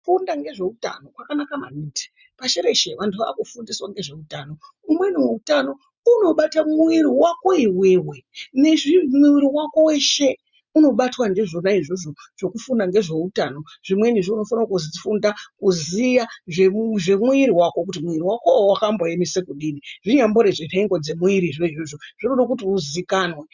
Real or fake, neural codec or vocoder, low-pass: real; none; 7.2 kHz